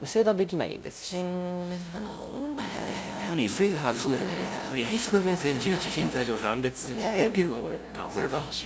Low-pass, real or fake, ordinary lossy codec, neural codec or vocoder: none; fake; none; codec, 16 kHz, 0.5 kbps, FunCodec, trained on LibriTTS, 25 frames a second